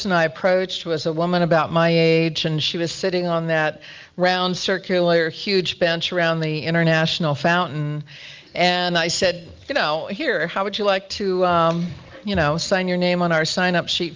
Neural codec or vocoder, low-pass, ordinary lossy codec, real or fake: none; 7.2 kHz; Opus, 32 kbps; real